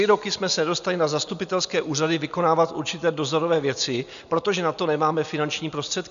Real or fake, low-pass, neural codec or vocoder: real; 7.2 kHz; none